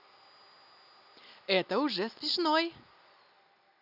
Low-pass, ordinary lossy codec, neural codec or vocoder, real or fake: 5.4 kHz; none; none; real